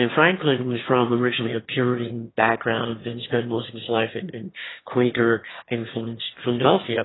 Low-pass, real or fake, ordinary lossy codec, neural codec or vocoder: 7.2 kHz; fake; AAC, 16 kbps; autoencoder, 22.05 kHz, a latent of 192 numbers a frame, VITS, trained on one speaker